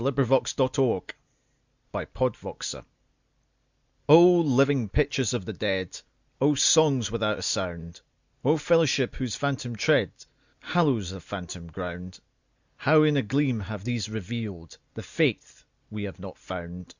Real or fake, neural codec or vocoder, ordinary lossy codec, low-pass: real; none; Opus, 64 kbps; 7.2 kHz